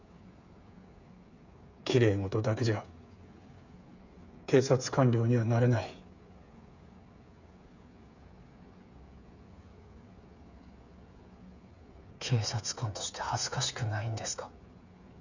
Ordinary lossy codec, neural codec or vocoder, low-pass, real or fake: none; codec, 16 kHz, 8 kbps, FreqCodec, smaller model; 7.2 kHz; fake